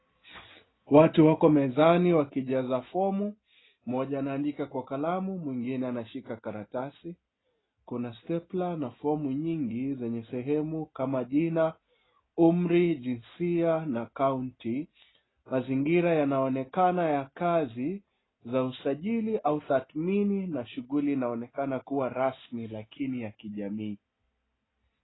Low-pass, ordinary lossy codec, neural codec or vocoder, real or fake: 7.2 kHz; AAC, 16 kbps; none; real